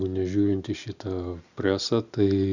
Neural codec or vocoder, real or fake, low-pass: none; real; 7.2 kHz